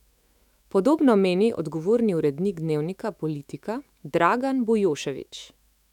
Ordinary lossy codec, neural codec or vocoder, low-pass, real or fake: none; autoencoder, 48 kHz, 128 numbers a frame, DAC-VAE, trained on Japanese speech; 19.8 kHz; fake